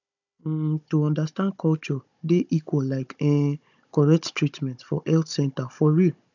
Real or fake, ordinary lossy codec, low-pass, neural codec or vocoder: fake; none; 7.2 kHz; codec, 16 kHz, 16 kbps, FunCodec, trained on Chinese and English, 50 frames a second